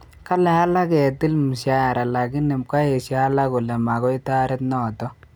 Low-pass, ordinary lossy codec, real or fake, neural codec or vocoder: none; none; real; none